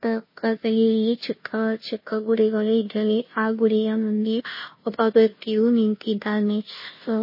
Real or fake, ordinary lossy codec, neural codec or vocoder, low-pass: fake; MP3, 24 kbps; codec, 16 kHz, 0.5 kbps, FunCodec, trained on Chinese and English, 25 frames a second; 5.4 kHz